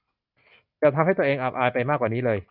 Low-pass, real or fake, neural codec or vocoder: 5.4 kHz; real; none